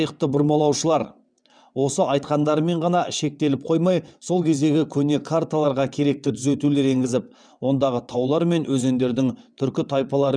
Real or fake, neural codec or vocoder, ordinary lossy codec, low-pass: fake; vocoder, 22.05 kHz, 80 mel bands, WaveNeXt; none; 9.9 kHz